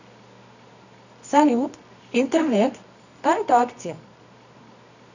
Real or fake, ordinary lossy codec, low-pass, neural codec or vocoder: fake; none; 7.2 kHz; codec, 24 kHz, 0.9 kbps, WavTokenizer, medium music audio release